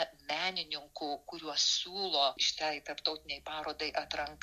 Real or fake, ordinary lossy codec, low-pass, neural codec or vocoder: real; MP3, 64 kbps; 14.4 kHz; none